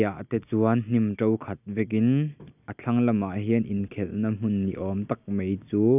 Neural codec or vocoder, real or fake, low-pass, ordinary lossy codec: none; real; 3.6 kHz; none